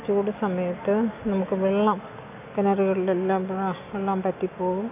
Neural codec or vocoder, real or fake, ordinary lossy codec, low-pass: none; real; none; 3.6 kHz